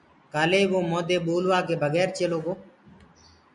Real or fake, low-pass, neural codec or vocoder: real; 10.8 kHz; none